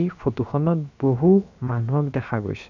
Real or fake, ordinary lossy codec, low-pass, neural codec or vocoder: fake; none; 7.2 kHz; codec, 16 kHz, 0.7 kbps, FocalCodec